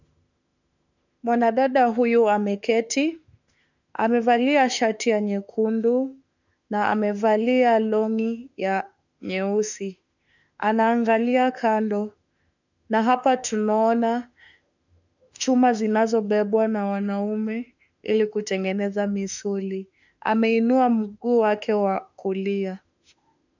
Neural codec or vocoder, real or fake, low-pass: autoencoder, 48 kHz, 32 numbers a frame, DAC-VAE, trained on Japanese speech; fake; 7.2 kHz